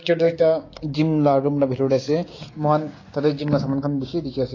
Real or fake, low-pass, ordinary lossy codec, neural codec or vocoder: fake; 7.2 kHz; AAC, 32 kbps; codec, 16 kHz, 6 kbps, DAC